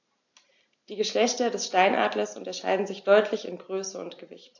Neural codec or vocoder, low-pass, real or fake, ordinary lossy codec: none; 7.2 kHz; real; AAC, 48 kbps